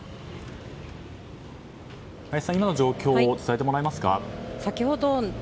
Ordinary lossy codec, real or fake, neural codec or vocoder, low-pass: none; real; none; none